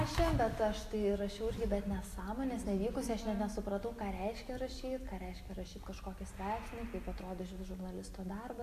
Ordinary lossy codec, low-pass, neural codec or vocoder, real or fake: AAC, 64 kbps; 14.4 kHz; none; real